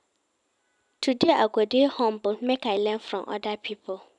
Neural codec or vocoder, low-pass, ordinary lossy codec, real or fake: vocoder, 44.1 kHz, 128 mel bands every 512 samples, BigVGAN v2; 10.8 kHz; none; fake